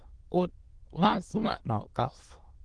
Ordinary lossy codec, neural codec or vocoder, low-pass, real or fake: Opus, 16 kbps; autoencoder, 22.05 kHz, a latent of 192 numbers a frame, VITS, trained on many speakers; 9.9 kHz; fake